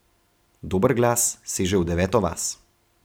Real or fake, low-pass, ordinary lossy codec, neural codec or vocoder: real; none; none; none